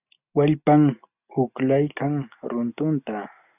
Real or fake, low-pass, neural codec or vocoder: real; 3.6 kHz; none